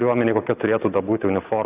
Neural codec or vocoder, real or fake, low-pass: none; real; 3.6 kHz